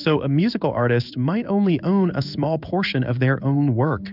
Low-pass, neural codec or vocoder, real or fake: 5.4 kHz; codec, 16 kHz in and 24 kHz out, 1 kbps, XY-Tokenizer; fake